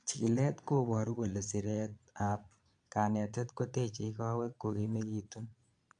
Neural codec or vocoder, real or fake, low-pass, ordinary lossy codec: vocoder, 22.05 kHz, 80 mel bands, Vocos; fake; 9.9 kHz; AAC, 64 kbps